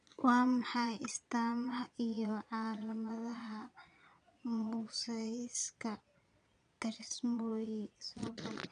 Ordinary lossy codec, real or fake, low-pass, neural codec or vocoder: none; fake; 9.9 kHz; vocoder, 22.05 kHz, 80 mel bands, Vocos